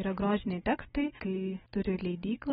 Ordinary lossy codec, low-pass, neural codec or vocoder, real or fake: AAC, 16 kbps; 19.8 kHz; none; real